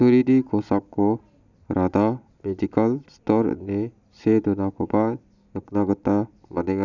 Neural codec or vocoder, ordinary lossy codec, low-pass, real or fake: none; none; 7.2 kHz; real